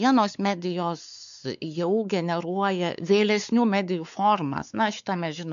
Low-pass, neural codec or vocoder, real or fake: 7.2 kHz; codec, 16 kHz, 4 kbps, X-Codec, WavLM features, trained on Multilingual LibriSpeech; fake